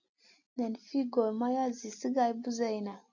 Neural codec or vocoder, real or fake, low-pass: none; real; 7.2 kHz